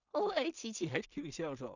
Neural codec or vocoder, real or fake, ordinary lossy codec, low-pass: codec, 16 kHz in and 24 kHz out, 0.4 kbps, LongCat-Audio-Codec, two codebook decoder; fake; none; 7.2 kHz